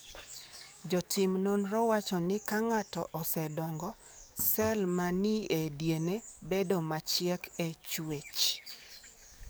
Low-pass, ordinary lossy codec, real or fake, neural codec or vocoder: none; none; fake; codec, 44.1 kHz, 7.8 kbps, DAC